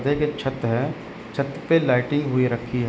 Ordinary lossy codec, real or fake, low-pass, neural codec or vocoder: none; real; none; none